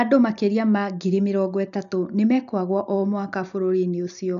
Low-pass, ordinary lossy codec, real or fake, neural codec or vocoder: 7.2 kHz; none; real; none